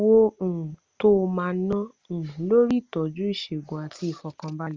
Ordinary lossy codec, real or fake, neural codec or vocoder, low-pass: Opus, 32 kbps; real; none; 7.2 kHz